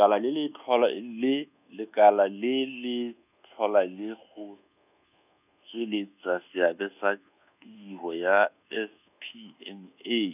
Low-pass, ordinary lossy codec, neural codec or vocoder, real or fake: 3.6 kHz; none; codec, 24 kHz, 1.2 kbps, DualCodec; fake